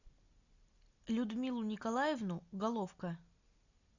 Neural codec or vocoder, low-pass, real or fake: none; 7.2 kHz; real